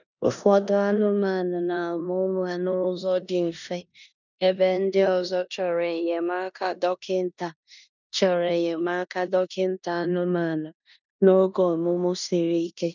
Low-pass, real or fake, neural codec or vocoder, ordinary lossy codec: 7.2 kHz; fake; codec, 16 kHz in and 24 kHz out, 0.9 kbps, LongCat-Audio-Codec, four codebook decoder; none